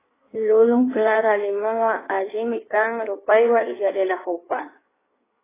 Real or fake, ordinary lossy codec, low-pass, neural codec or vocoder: fake; AAC, 16 kbps; 3.6 kHz; codec, 16 kHz in and 24 kHz out, 1.1 kbps, FireRedTTS-2 codec